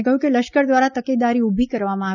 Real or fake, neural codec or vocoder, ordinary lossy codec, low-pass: real; none; none; 7.2 kHz